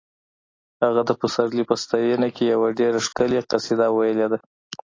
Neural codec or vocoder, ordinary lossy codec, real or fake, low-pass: none; AAC, 32 kbps; real; 7.2 kHz